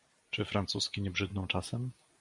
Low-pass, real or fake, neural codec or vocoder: 10.8 kHz; real; none